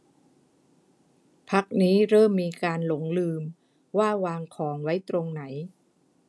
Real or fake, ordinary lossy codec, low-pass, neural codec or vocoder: real; none; none; none